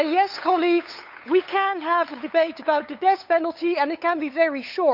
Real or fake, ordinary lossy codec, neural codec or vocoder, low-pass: fake; none; codec, 16 kHz, 8 kbps, FunCodec, trained on LibriTTS, 25 frames a second; 5.4 kHz